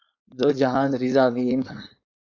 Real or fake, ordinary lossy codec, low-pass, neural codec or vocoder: fake; AAC, 48 kbps; 7.2 kHz; codec, 16 kHz, 4.8 kbps, FACodec